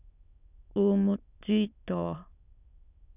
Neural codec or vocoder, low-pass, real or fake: autoencoder, 22.05 kHz, a latent of 192 numbers a frame, VITS, trained on many speakers; 3.6 kHz; fake